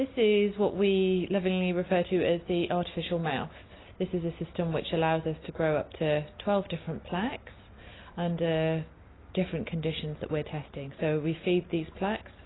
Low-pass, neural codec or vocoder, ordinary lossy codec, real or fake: 7.2 kHz; none; AAC, 16 kbps; real